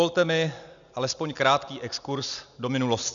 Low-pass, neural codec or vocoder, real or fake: 7.2 kHz; none; real